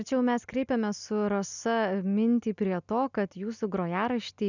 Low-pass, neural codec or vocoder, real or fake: 7.2 kHz; none; real